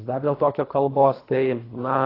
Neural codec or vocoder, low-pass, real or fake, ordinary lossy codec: codec, 24 kHz, 3 kbps, HILCodec; 5.4 kHz; fake; AAC, 24 kbps